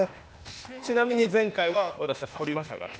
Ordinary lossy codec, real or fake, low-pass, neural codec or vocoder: none; fake; none; codec, 16 kHz, 0.8 kbps, ZipCodec